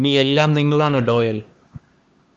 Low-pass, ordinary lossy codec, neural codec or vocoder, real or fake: 7.2 kHz; Opus, 32 kbps; codec, 16 kHz, 2 kbps, X-Codec, HuBERT features, trained on LibriSpeech; fake